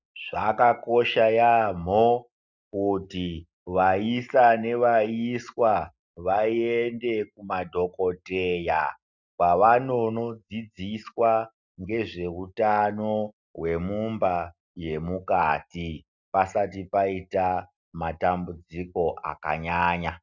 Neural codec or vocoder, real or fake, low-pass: none; real; 7.2 kHz